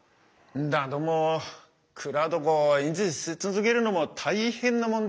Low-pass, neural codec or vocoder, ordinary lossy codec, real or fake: none; none; none; real